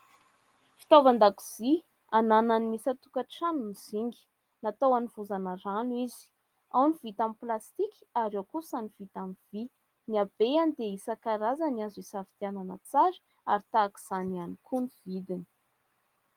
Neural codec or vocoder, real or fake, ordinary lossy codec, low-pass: none; real; Opus, 24 kbps; 19.8 kHz